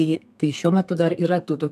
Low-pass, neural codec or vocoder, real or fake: 14.4 kHz; codec, 32 kHz, 1.9 kbps, SNAC; fake